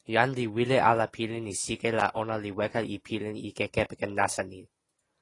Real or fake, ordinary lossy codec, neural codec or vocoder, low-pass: real; AAC, 32 kbps; none; 10.8 kHz